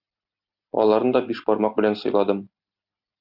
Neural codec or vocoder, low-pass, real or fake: none; 5.4 kHz; real